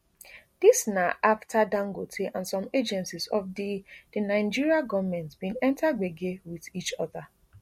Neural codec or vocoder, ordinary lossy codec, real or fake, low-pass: none; MP3, 64 kbps; real; 19.8 kHz